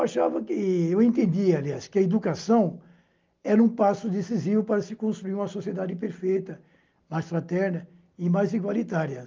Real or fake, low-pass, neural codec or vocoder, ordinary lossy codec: real; 7.2 kHz; none; Opus, 24 kbps